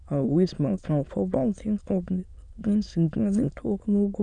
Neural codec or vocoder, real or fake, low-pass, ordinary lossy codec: autoencoder, 22.05 kHz, a latent of 192 numbers a frame, VITS, trained on many speakers; fake; 9.9 kHz; none